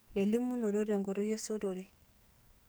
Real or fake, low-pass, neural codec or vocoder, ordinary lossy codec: fake; none; codec, 44.1 kHz, 2.6 kbps, SNAC; none